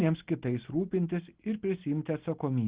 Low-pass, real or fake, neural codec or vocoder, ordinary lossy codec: 3.6 kHz; real; none; Opus, 16 kbps